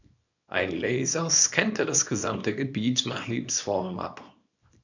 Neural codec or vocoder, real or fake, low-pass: codec, 24 kHz, 0.9 kbps, WavTokenizer, small release; fake; 7.2 kHz